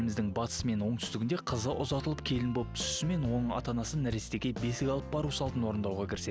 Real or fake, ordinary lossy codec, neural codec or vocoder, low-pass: real; none; none; none